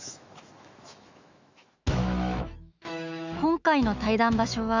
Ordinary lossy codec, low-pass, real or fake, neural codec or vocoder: Opus, 64 kbps; 7.2 kHz; fake; autoencoder, 48 kHz, 128 numbers a frame, DAC-VAE, trained on Japanese speech